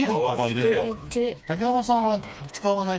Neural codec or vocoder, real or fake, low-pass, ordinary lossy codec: codec, 16 kHz, 2 kbps, FreqCodec, smaller model; fake; none; none